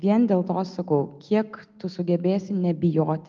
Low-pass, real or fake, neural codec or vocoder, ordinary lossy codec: 7.2 kHz; real; none; Opus, 32 kbps